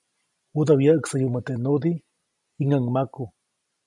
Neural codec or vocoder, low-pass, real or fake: none; 10.8 kHz; real